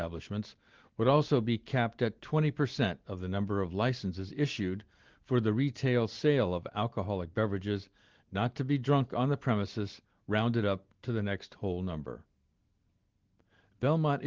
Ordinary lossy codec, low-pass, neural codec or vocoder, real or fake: Opus, 16 kbps; 7.2 kHz; none; real